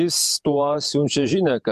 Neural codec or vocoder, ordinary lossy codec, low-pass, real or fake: vocoder, 48 kHz, 128 mel bands, Vocos; MP3, 96 kbps; 14.4 kHz; fake